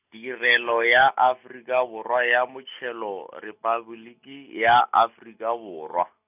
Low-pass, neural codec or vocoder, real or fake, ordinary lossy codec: 3.6 kHz; none; real; none